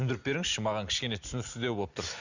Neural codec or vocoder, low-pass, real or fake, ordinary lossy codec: none; 7.2 kHz; real; none